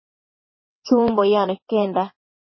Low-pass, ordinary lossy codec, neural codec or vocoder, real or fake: 7.2 kHz; MP3, 24 kbps; vocoder, 22.05 kHz, 80 mel bands, WaveNeXt; fake